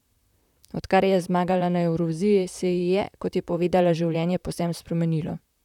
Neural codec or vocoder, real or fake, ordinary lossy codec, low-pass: vocoder, 44.1 kHz, 128 mel bands, Pupu-Vocoder; fake; none; 19.8 kHz